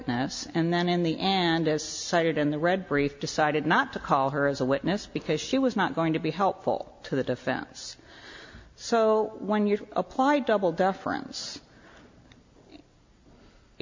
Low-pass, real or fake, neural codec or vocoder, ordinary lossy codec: 7.2 kHz; real; none; MP3, 48 kbps